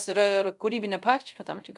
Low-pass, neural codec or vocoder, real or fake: 10.8 kHz; codec, 24 kHz, 0.5 kbps, DualCodec; fake